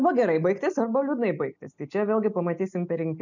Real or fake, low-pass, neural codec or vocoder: real; 7.2 kHz; none